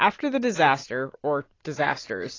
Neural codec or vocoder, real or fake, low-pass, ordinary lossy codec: none; real; 7.2 kHz; AAC, 32 kbps